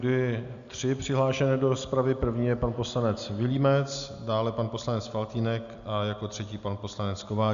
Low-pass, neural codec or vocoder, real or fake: 7.2 kHz; none; real